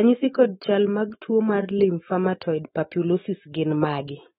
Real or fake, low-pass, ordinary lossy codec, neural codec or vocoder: fake; 19.8 kHz; AAC, 16 kbps; autoencoder, 48 kHz, 128 numbers a frame, DAC-VAE, trained on Japanese speech